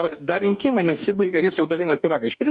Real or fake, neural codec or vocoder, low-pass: fake; codec, 44.1 kHz, 2.6 kbps, DAC; 10.8 kHz